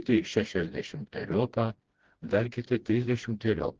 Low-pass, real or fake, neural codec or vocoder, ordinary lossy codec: 7.2 kHz; fake; codec, 16 kHz, 1 kbps, FreqCodec, smaller model; Opus, 16 kbps